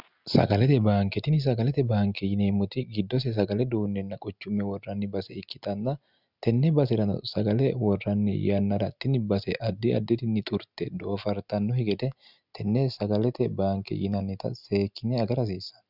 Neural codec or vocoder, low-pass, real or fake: none; 5.4 kHz; real